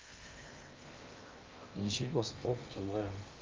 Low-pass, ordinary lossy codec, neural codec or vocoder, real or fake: 7.2 kHz; Opus, 32 kbps; codec, 16 kHz in and 24 kHz out, 0.6 kbps, FocalCodec, streaming, 2048 codes; fake